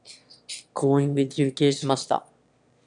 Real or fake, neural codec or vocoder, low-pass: fake; autoencoder, 22.05 kHz, a latent of 192 numbers a frame, VITS, trained on one speaker; 9.9 kHz